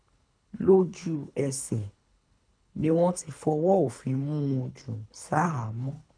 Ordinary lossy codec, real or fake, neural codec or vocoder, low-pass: none; fake; codec, 24 kHz, 3 kbps, HILCodec; 9.9 kHz